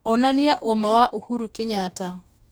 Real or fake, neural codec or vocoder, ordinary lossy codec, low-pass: fake; codec, 44.1 kHz, 2.6 kbps, DAC; none; none